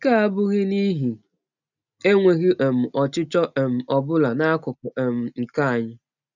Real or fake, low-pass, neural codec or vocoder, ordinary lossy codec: real; 7.2 kHz; none; none